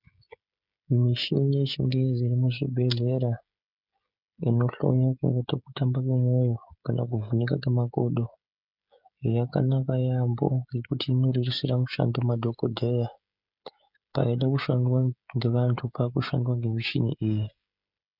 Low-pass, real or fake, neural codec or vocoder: 5.4 kHz; fake; codec, 16 kHz, 16 kbps, FreqCodec, smaller model